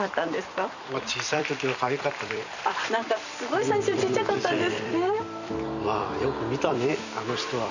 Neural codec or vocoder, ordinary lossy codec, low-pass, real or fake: vocoder, 44.1 kHz, 128 mel bands every 256 samples, BigVGAN v2; none; 7.2 kHz; fake